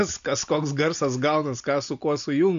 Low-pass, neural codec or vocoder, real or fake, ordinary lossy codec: 7.2 kHz; none; real; AAC, 96 kbps